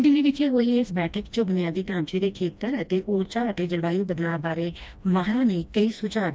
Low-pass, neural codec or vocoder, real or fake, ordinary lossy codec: none; codec, 16 kHz, 1 kbps, FreqCodec, smaller model; fake; none